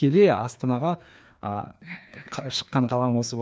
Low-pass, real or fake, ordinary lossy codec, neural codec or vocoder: none; fake; none; codec, 16 kHz, 2 kbps, FreqCodec, larger model